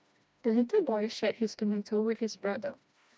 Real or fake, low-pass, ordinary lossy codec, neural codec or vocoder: fake; none; none; codec, 16 kHz, 1 kbps, FreqCodec, smaller model